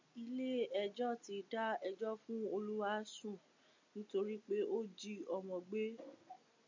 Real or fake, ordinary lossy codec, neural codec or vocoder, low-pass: real; MP3, 64 kbps; none; 7.2 kHz